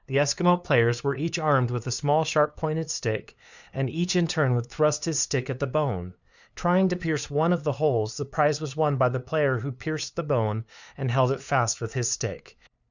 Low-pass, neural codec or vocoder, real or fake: 7.2 kHz; codec, 16 kHz, 2 kbps, FunCodec, trained on LibriTTS, 25 frames a second; fake